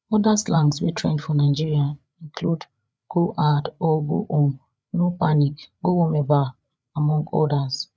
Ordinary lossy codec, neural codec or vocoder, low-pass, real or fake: none; codec, 16 kHz, 8 kbps, FreqCodec, larger model; none; fake